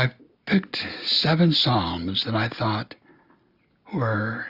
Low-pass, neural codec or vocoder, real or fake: 5.4 kHz; none; real